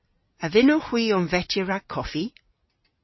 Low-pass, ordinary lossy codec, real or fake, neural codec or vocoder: 7.2 kHz; MP3, 24 kbps; real; none